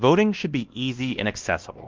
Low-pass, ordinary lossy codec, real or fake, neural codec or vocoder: 7.2 kHz; Opus, 32 kbps; fake; codec, 16 kHz, 1 kbps, X-Codec, WavLM features, trained on Multilingual LibriSpeech